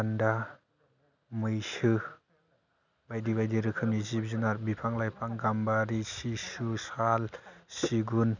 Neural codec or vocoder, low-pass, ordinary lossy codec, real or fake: none; 7.2 kHz; none; real